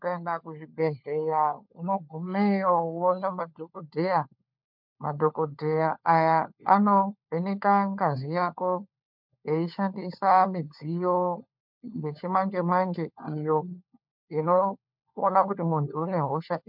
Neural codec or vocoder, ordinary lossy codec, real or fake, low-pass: codec, 16 kHz, 4 kbps, FunCodec, trained on LibriTTS, 50 frames a second; MP3, 48 kbps; fake; 5.4 kHz